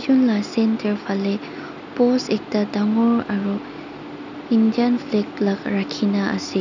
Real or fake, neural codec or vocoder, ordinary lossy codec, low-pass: real; none; none; 7.2 kHz